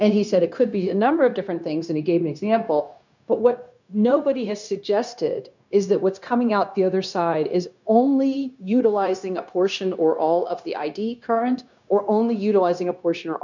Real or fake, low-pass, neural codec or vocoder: fake; 7.2 kHz; codec, 16 kHz, 0.9 kbps, LongCat-Audio-Codec